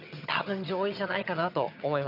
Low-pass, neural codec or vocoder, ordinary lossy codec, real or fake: 5.4 kHz; vocoder, 22.05 kHz, 80 mel bands, HiFi-GAN; none; fake